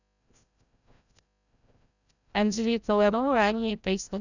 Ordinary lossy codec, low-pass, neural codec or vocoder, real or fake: none; 7.2 kHz; codec, 16 kHz, 0.5 kbps, FreqCodec, larger model; fake